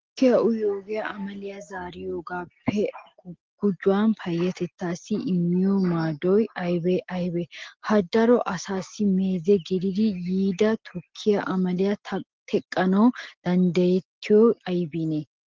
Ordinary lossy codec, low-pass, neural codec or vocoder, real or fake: Opus, 16 kbps; 7.2 kHz; none; real